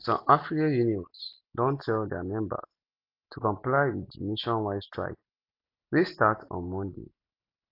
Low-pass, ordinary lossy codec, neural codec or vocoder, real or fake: 5.4 kHz; AAC, 32 kbps; none; real